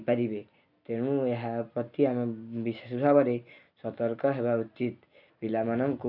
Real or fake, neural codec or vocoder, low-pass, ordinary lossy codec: real; none; 5.4 kHz; none